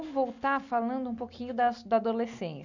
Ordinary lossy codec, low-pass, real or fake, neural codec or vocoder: none; 7.2 kHz; real; none